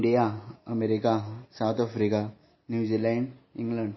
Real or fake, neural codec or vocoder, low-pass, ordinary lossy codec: real; none; 7.2 kHz; MP3, 24 kbps